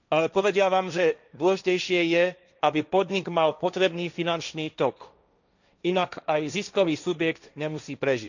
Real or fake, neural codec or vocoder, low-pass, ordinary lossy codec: fake; codec, 16 kHz, 1.1 kbps, Voila-Tokenizer; 7.2 kHz; none